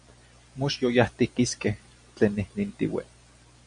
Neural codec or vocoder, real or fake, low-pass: none; real; 9.9 kHz